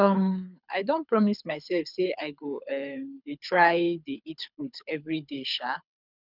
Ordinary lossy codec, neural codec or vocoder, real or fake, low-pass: none; codec, 24 kHz, 6 kbps, HILCodec; fake; 5.4 kHz